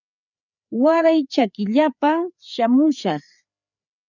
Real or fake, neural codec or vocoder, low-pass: fake; codec, 16 kHz, 4 kbps, FreqCodec, larger model; 7.2 kHz